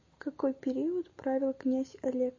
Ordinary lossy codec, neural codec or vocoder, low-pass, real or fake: MP3, 32 kbps; none; 7.2 kHz; real